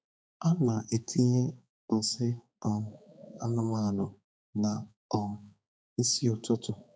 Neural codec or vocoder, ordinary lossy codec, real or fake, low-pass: codec, 16 kHz, 4 kbps, X-Codec, HuBERT features, trained on balanced general audio; none; fake; none